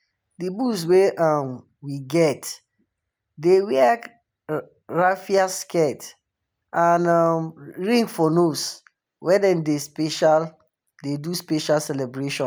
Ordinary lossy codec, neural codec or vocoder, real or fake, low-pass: none; none; real; none